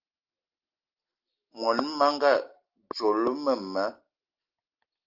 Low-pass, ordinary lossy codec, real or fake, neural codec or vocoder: 5.4 kHz; Opus, 32 kbps; real; none